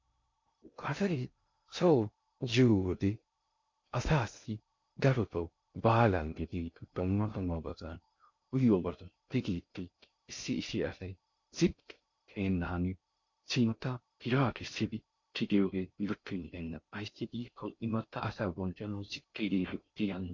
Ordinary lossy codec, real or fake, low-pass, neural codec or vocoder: MP3, 48 kbps; fake; 7.2 kHz; codec, 16 kHz in and 24 kHz out, 0.6 kbps, FocalCodec, streaming, 2048 codes